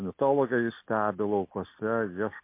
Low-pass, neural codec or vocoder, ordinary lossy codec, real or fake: 3.6 kHz; none; MP3, 24 kbps; real